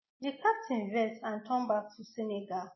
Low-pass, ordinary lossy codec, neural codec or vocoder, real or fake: 7.2 kHz; MP3, 24 kbps; none; real